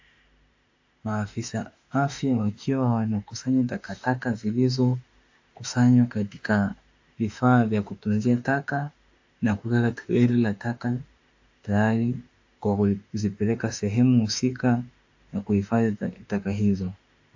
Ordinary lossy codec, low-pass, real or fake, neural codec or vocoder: AAC, 48 kbps; 7.2 kHz; fake; autoencoder, 48 kHz, 32 numbers a frame, DAC-VAE, trained on Japanese speech